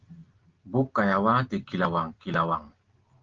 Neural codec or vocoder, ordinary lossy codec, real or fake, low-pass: none; Opus, 16 kbps; real; 7.2 kHz